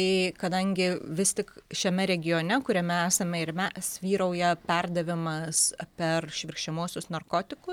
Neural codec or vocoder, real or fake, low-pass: none; real; 19.8 kHz